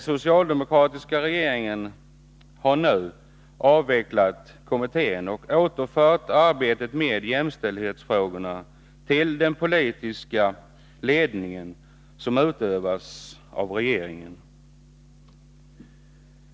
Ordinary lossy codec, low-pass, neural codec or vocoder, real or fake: none; none; none; real